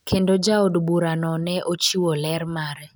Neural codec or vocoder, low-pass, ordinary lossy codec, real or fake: none; none; none; real